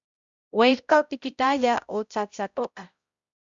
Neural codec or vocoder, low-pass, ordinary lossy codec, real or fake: codec, 16 kHz, 0.5 kbps, X-Codec, HuBERT features, trained on balanced general audio; 7.2 kHz; Opus, 64 kbps; fake